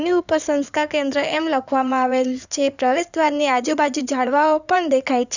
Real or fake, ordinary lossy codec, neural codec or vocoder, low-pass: fake; none; codec, 16 kHz in and 24 kHz out, 2.2 kbps, FireRedTTS-2 codec; 7.2 kHz